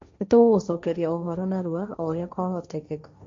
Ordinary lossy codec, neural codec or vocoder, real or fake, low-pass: MP3, 48 kbps; codec, 16 kHz, 1.1 kbps, Voila-Tokenizer; fake; 7.2 kHz